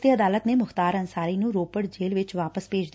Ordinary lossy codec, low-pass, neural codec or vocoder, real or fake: none; none; none; real